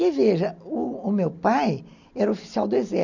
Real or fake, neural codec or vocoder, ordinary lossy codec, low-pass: real; none; none; 7.2 kHz